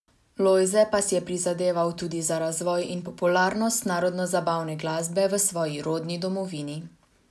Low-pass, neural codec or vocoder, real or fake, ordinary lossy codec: none; none; real; none